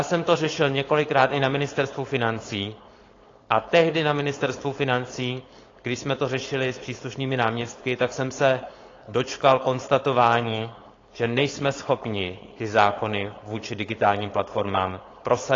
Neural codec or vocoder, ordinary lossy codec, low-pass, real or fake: codec, 16 kHz, 4.8 kbps, FACodec; AAC, 32 kbps; 7.2 kHz; fake